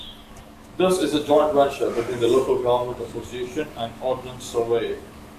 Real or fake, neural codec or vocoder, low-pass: fake; codec, 44.1 kHz, 7.8 kbps, DAC; 14.4 kHz